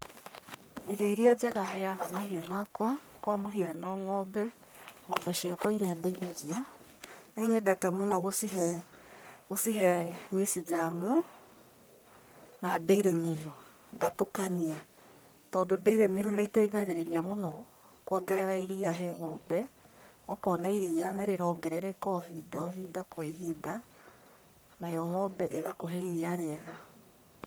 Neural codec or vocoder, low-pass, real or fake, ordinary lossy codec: codec, 44.1 kHz, 1.7 kbps, Pupu-Codec; none; fake; none